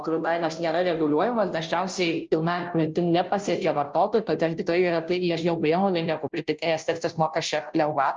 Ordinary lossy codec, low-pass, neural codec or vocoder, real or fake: Opus, 24 kbps; 7.2 kHz; codec, 16 kHz, 0.5 kbps, FunCodec, trained on Chinese and English, 25 frames a second; fake